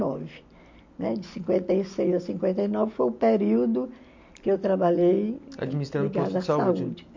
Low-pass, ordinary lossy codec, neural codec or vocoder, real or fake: 7.2 kHz; MP3, 48 kbps; none; real